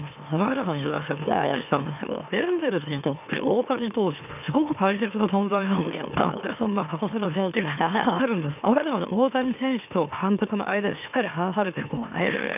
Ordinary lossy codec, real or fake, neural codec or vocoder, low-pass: none; fake; autoencoder, 44.1 kHz, a latent of 192 numbers a frame, MeloTTS; 3.6 kHz